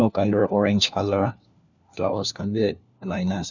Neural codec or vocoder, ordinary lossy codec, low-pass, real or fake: codec, 16 kHz, 1 kbps, FunCodec, trained on LibriTTS, 50 frames a second; none; 7.2 kHz; fake